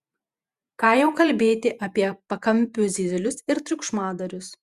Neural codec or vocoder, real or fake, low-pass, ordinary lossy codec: vocoder, 48 kHz, 128 mel bands, Vocos; fake; 14.4 kHz; Opus, 64 kbps